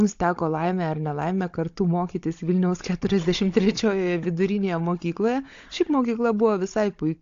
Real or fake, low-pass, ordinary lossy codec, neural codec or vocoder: fake; 7.2 kHz; AAC, 48 kbps; codec, 16 kHz, 16 kbps, FunCodec, trained on Chinese and English, 50 frames a second